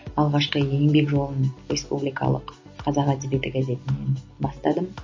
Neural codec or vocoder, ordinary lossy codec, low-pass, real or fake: none; MP3, 32 kbps; 7.2 kHz; real